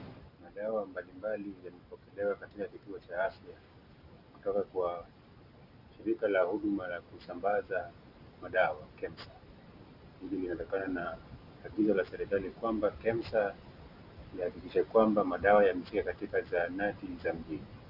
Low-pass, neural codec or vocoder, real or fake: 5.4 kHz; none; real